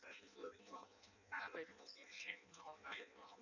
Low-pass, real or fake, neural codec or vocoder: 7.2 kHz; fake; codec, 16 kHz in and 24 kHz out, 0.6 kbps, FireRedTTS-2 codec